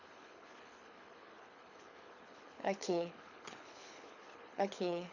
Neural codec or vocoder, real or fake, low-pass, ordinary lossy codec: codec, 24 kHz, 6 kbps, HILCodec; fake; 7.2 kHz; none